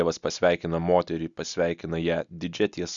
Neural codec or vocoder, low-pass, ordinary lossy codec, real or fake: none; 7.2 kHz; Opus, 64 kbps; real